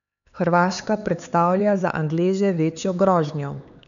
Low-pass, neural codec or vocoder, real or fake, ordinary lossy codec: 7.2 kHz; codec, 16 kHz, 4 kbps, X-Codec, HuBERT features, trained on LibriSpeech; fake; none